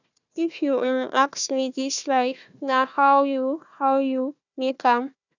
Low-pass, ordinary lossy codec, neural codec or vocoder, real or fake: 7.2 kHz; none; codec, 16 kHz, 1 kbps, FunCodec, trained on Chinese and English, 50 frames a second; fake